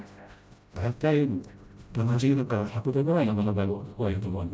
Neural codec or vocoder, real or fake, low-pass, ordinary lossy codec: codec, 16 kHz, 0.5 kbps, FreqCodec, smaller model; fake; none; none